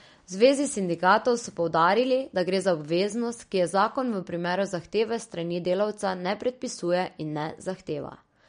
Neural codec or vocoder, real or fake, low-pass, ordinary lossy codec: none; real; 9.9 kHz; MP3, 48 kbps